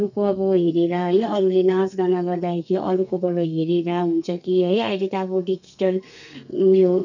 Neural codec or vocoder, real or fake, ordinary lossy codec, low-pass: codec, 32 kHz, 1.9 kbps, SNAC; fake; none; 7.2 kHz